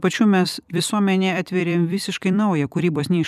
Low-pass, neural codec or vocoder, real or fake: 14.4 kHz; vocoder, 44.1 kHz, 128 mel bands every 256 samples, BigVGAN v2; fake